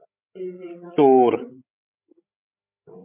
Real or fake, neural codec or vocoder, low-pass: fake; codec, 16 kHz, 16 kbps, FreqCodec, larger model; 3.6 kHz